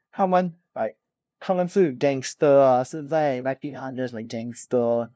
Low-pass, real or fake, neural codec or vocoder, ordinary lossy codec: none; fake; codec, 16 kHz, 0.5 kbps, FunCodec, trained on LibriTTS, 25 frames a second; none